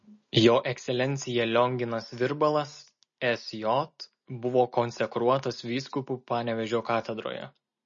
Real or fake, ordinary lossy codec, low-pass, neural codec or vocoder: real; MP3, 32 kbps; 7.2 kHz; none